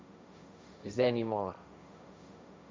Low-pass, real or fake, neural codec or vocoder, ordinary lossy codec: none; fake; codec, 16 kHz, 1.1 kbps, Voila-Tokenizer; none